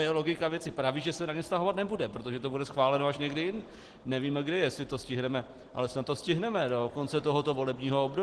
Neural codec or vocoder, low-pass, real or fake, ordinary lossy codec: none; 10.8 kHz; real; Opus, 16 kbps